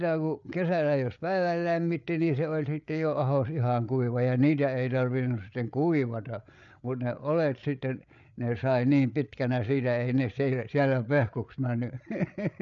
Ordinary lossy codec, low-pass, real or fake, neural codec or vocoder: none; 7.2 kHz; fake; codec, 16 kHz, 8 kbps, FreqCodec, larger model